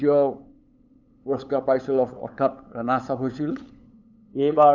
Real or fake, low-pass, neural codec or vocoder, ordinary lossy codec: fake; 7.2 kHz; codec, 16 kHz, 8 kbps, FunCodec, trained on LibriTTS, 25 frames a second; none